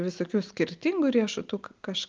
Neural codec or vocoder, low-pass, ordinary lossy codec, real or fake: none; 7.2 kHz; Opus, 24 kbps; real